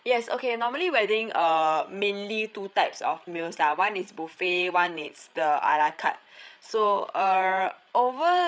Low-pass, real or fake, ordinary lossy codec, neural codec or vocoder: none; fake; none; codec, 16 kHz, 8 kbps, FreqCodec, larger model